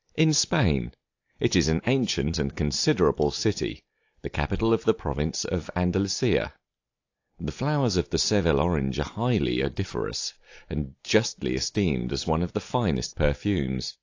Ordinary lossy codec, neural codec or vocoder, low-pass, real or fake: AAC, 48 kbps; none; 7.2 kHz; real